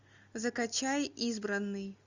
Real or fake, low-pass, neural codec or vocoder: real; 7.2 kHz; none